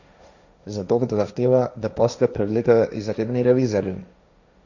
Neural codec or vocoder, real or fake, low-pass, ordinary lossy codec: codec, 16 kHz, 1.1 kbps, Voila-Tokenizer; fake; 7.2 kHz; none